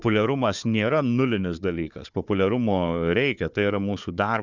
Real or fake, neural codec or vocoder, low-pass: fake; codec, 16 kHz, 4 kbps, FunCodec, trained on Chinese and English, 50 frames a second; 7.2 kHz